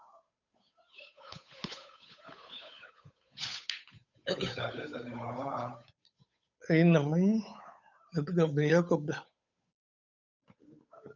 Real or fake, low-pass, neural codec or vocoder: fake; 7.2 kHz; codec, 16 kHz, 8 kbps, FunCodec, trained on Chinese and English, 25 frames a second